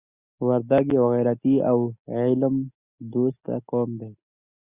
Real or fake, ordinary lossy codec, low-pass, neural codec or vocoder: real; Opus, 64 kbps; 3.6 kHz; none